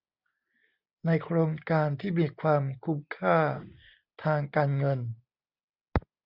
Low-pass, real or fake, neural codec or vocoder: 5.4 kHz; real; none